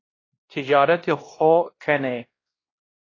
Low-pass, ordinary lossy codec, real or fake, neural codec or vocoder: 7.2 kHz; AAC, 32 kbps; fake; codec, 16 kHz, 1 kbps, X-Codec, WavLM features, trained on Multilingual LibriSpeech